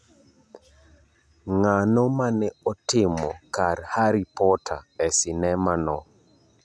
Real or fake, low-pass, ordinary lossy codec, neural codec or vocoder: real; none; none; none